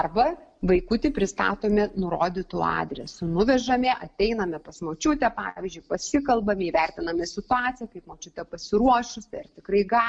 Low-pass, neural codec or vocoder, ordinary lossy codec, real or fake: 9.9 kHz; none; MP3, 64 kbps; real